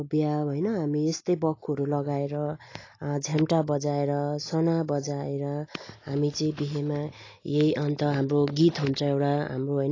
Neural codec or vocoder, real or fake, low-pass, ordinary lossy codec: none; real; 7.2 kHz; AAC, 32 kbps